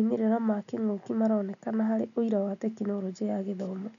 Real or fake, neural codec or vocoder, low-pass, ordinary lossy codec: real; none; 7.2 kHz; none